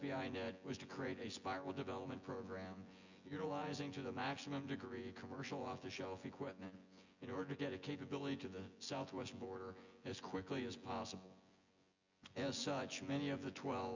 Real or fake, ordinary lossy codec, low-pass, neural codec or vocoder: fake; Opus, 64 kbps; 7.2 kHz; vocoder, 24 kHz, 100 mel bands, Vocos